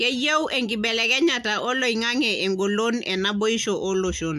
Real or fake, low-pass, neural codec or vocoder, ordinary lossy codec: real; 14.4 kHz; none; AAC, 96 kbps